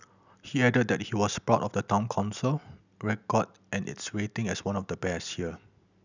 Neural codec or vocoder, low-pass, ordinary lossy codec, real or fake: none; 7.2 kHz; none; real